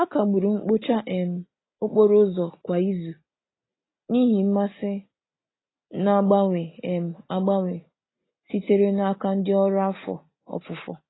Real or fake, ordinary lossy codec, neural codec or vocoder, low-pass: real; AAC, 16 kbps; none; 7.2 kHz